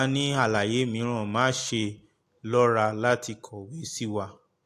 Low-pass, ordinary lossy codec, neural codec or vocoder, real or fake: 14.4 kHz; AAC, 64 kbps; none; real